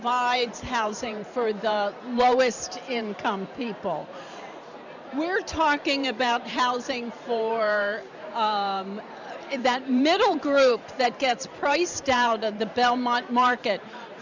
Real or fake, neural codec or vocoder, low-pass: fake; vocoder, 44.1 kHz, 128 mel bands every 512 samples, BigVGAN v2; 7.2 kHz